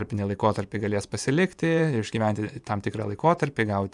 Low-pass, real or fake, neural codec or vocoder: 10.8 kHz; fake; vocoder, 24 kHz, 100 mel bands, Vocos